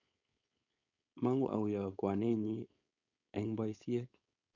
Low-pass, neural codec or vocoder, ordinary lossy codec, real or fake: 7.2 kHz; codec, 16 kHz, 4.8 kbps, FACodec; none; fake